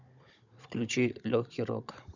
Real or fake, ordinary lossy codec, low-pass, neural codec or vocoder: fake; none; 7.2 kHz; codec, 16 kHz, 16 kbps, FunCodec, trained on Chinese and English, 50 frames a second